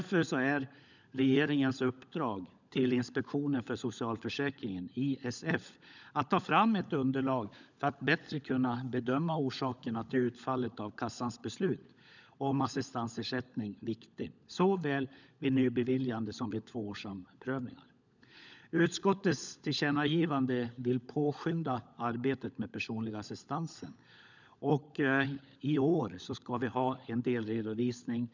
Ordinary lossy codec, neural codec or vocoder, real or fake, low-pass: none; codec, 16 kHz, 16 kbps, FunCodec, trained on LibriTTS, 50 frames a second; fake; 7.2 kHz